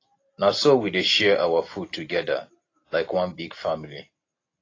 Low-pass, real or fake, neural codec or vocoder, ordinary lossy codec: 7.2 kHz; real; none; AAC, 32 kbps